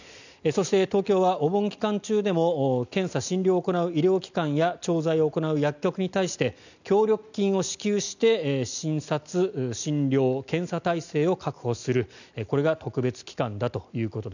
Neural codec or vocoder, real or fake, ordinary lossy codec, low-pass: none; real; none; 7.2 kHz